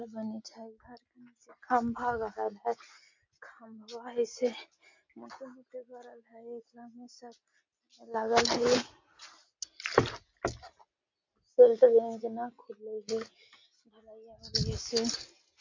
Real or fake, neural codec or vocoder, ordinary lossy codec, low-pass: real; none; MP3, 48 kbps; 7.2 kHz